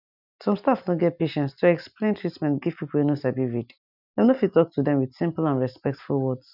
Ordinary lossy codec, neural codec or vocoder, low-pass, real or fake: none; none; 5.4 kHz; real